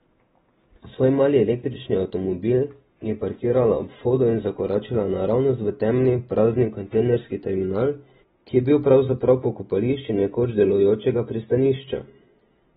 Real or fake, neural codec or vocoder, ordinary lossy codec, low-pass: real; none; AAC, 16 kbps; 14.4 kHz